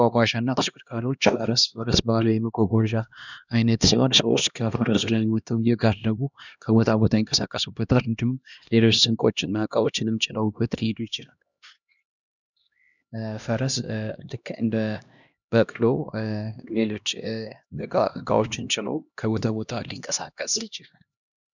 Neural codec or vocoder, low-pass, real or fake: codec, 16 kHz, 1 kbps, X-Codec, HuBERT features, trained on LibriSpeech; 7.2 kHz; fake